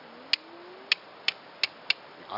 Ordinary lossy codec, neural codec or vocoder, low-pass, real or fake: none; none; 5.4 kHz; real